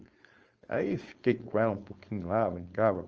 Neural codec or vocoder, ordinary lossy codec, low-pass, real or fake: codec, 16 kHz, 4.8 kbps, FACodec; Opus, 24 kbps; 7.2 kHz; fake